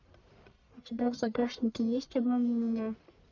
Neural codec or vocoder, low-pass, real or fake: codec, 44.1 kHz, 1.7 kbps, Pupu-Codec; 7.2 kHz; fake